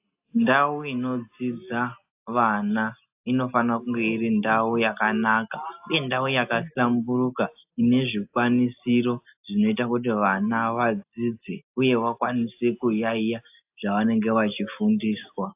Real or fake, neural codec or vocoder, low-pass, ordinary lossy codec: real; none; 3.6 kHz; AAC, 32 kbps